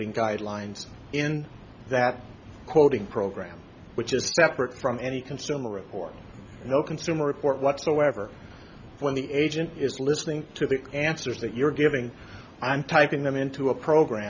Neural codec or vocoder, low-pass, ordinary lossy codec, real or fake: none; 7.2 kHz; Opus, 64 kbps; real